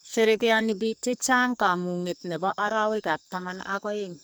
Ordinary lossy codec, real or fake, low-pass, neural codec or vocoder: none; fake; none; codec, 44.1 kHz, 2.6 kbps, SNAC